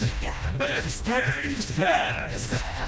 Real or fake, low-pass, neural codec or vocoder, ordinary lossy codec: fake; none; codec, 16 kHz, 1 kbps, FreqCodec, smaller model; none